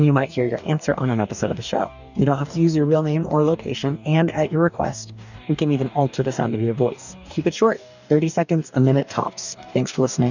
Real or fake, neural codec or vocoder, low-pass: fake; codec, 44.1 kHz, 2.6 kbps, DAC; 7.2 kHz